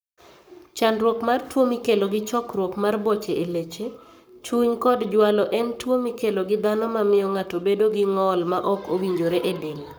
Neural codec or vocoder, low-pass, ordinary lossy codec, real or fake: codec, 44.1 kHz, 7.8 kbps, Pupu-Codec; none; none; fake